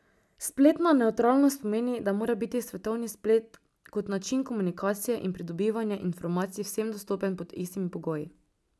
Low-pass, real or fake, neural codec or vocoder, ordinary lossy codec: none; real; none; none